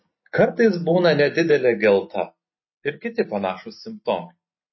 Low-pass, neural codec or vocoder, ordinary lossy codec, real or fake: 7.2 kHz; none; MP3, 24 kbps; real